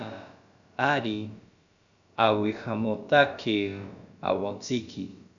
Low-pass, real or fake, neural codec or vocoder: 7.2 kHz; fake; codec, 16 kHz, about 1 kbps, DyCAST, with the encoder's durations